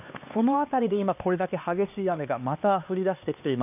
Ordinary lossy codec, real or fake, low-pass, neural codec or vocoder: none; fake; 3.6 kHz; codec, 16 kHz, 2 kbps, X-Codec, HuBERT features, trained on LibriSpeech